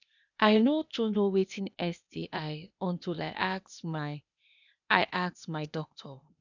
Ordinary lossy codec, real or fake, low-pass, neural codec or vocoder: none; fake; 7.2 kHz; codec, 16 kHz, 0.8 kbps, ZipCodec